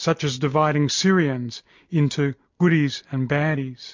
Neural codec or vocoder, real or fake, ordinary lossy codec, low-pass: none; real; MP3, 48 kbps; 7.2 kHz